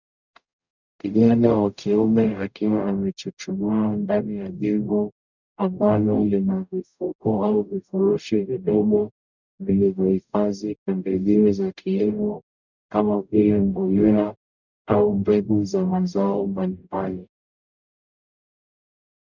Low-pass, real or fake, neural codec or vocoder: 7.2 kHz; fake; codec, 44.1 kHz, 0.9 kbps, DAC